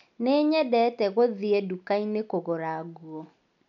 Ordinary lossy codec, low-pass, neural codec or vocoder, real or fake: none; 7.2 kHz; none; real